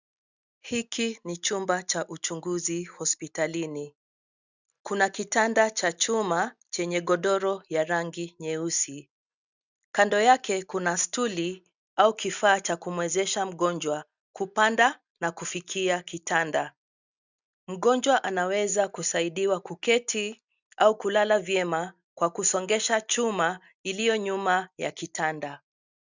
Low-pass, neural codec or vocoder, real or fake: 7.2 kHz; none; real